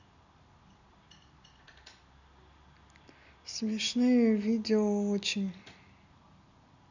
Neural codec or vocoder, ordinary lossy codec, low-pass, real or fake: none; none; 7.2 kHz; real